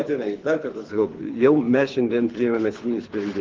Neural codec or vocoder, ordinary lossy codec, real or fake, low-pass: codec, 24 kHz, 6 kbps, HILCodec; Opus, 16 kbps; fake; 7.2 kHz